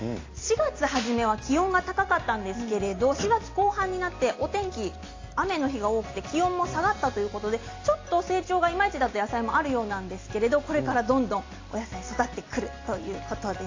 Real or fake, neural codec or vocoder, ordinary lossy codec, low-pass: real; none; AAC, 32 kbps; 7.2 kHz